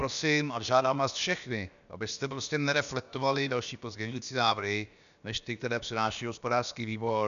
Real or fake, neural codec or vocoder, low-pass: fake; codec, 16 kHz, about 1 kbps, DyCAST, with the encoder's durations; 7.2 kHz